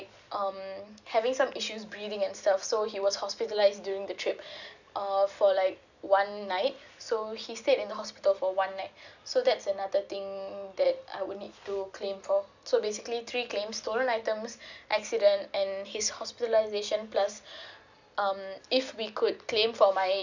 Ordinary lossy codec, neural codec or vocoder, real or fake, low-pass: none; none; real; 7.2 kHz